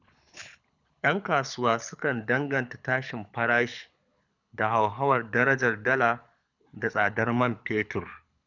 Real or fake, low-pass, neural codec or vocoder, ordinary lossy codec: fake; 7.2 kHz; codec, 24 kHz, 6 kbps, HILCodec; none